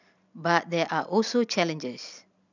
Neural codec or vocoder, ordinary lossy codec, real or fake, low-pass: none; none; real; 7.2 kHz